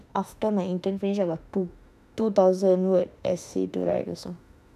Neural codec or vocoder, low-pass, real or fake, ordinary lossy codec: autoencoder, 48 kHz, 32 numbers a frame, DAC-VAE, trained on Japanese speech; 14.4 kHz; fake; none